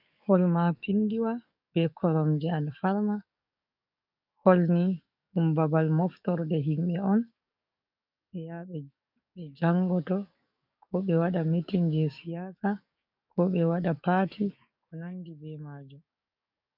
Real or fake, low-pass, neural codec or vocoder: fake; 5.4 kHz; codec, 44.1 kHz, 7.8 kbps, DAC